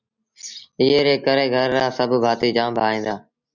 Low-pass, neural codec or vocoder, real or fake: 7.2 kHz; none; real